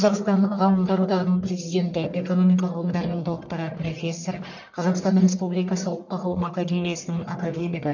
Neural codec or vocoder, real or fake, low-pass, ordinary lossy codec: codec, 44.1 kHz, 1.7 kbps, Pupu-Codec; fake; 7.2 kHz; none